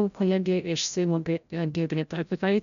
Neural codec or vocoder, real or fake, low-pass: codec, 16 kHz, 0.5 kbps, FreqCodec, larger model; fake; 7.2 kHz